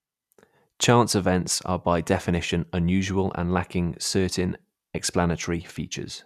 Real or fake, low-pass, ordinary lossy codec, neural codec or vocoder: real; 14.4 kHz; none; none